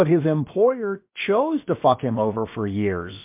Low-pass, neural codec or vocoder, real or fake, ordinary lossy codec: 3.6 kHz; codec, 16 kHz, about 1 kbps, DyCAST, with the encoder's durations; fake; MP3, 32 kbps